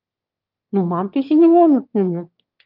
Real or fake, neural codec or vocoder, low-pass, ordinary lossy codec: fake; autoencoder, 22.05 kHz, a latent of 192 numbers a frame, VITS, trained on one speaker; 5.4 kHz; Opus, 24 kbps